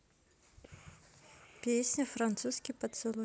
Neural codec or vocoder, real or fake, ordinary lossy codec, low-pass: none; real; none; none